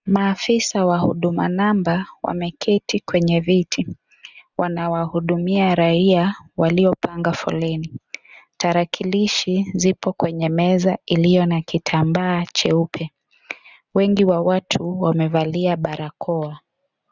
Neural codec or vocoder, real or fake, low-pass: none; real; 7.2 kHz